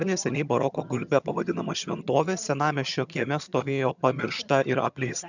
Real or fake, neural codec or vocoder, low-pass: fake; vocoder, 22.05 kHz, 80 mel bands, HiFi-GAN; 7.2 kHz